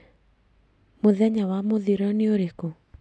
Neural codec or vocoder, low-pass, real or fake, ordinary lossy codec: none; none; real; none